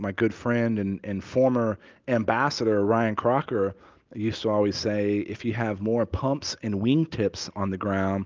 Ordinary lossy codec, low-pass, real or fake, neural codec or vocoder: Opus, 24 kbps; 7.2 kHz; real; none